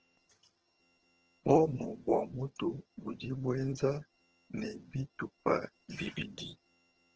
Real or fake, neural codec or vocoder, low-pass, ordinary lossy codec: fake; vocoder, 22.05 kHz, 80 mel bands, HiFi-GAN; 7.2 kHz; Opus, 16 kbps